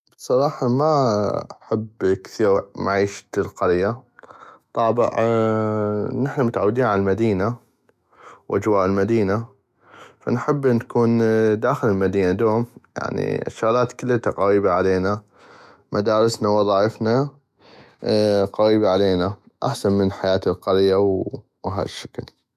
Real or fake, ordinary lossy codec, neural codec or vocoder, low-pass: fake; MP3, 96 kbps; autoencoder, 48 kHz, 128 numbers a frame, DAC-VAE, trained on Japanese speech; 14.4 kHz